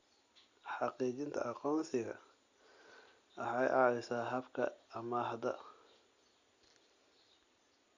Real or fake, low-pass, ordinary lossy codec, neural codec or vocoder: real; 7.2 kHz; Opus, 64 kbps; none